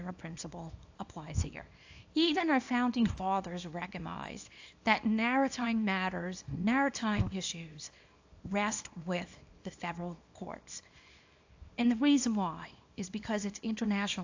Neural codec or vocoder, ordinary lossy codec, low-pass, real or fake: codec, 24 kHz, 0.9 kbps, WavTokenizer, small release; AAC, 48 kbps; 7.2 kHz; fake